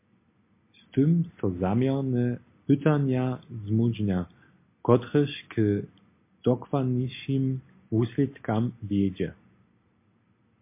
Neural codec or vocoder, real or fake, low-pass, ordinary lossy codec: none; real; 3.6 kHz; MP3, 24 kbps